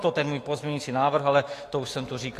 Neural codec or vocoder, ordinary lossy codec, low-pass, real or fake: autoencoder, 48 kHz, 128 numbers a frame, DAC-VAE, trained on Japanese speech; AAC, 48 kbps; 14.4 kHz; fake